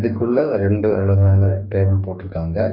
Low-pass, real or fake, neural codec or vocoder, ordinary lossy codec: 5.4 kHz; fake; codec, 44.1 kHz, 2.6 kbps, DAC; none